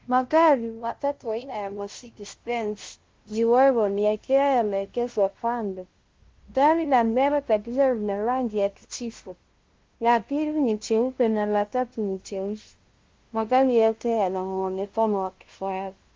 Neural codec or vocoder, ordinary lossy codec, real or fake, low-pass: codec, 16 kHz, 0.5 kbps, FunCodec, trained on LibriTTS, 25 frames a second; Opus, 16 kbps; fake; 7.2 kHz